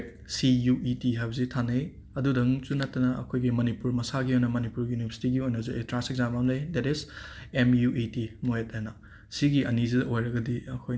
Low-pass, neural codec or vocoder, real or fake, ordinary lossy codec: none; none; real; none